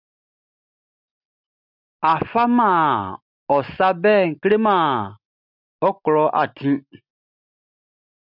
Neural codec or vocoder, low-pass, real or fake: none; 5.4 kHz; real